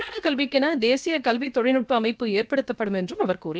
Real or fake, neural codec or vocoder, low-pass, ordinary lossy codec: fake; codec, 16 kHz, about 1 kbps, DyCAST, with the encoder's durations; none; none